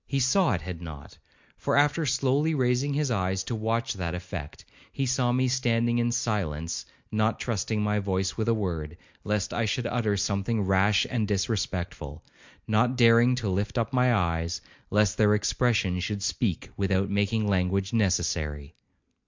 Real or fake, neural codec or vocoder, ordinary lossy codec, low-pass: real; none; MP3, 64 kbps; 7.2 kHz